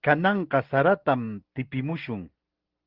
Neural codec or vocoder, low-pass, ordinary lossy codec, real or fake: none; 5.4 kHz; Opus, 16 kbps; real